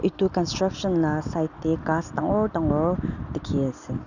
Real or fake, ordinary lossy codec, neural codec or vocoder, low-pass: real; none; none; 7.2 kHz